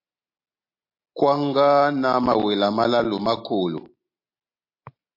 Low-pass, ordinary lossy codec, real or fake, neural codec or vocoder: 5.4 kHz; MP3, 32 kbps; real; none